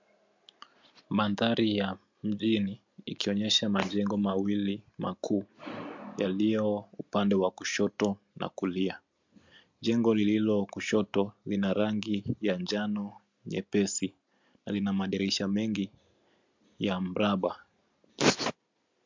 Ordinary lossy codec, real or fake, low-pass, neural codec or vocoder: MP3, 64 kbps; real; 7.2 kHz; none